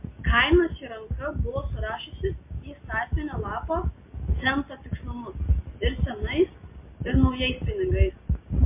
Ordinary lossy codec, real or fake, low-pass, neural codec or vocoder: MP3, 24 kbps; real; 3.6 kHz; none